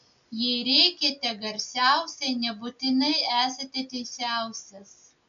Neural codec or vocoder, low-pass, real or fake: none; 7.2 kHz; real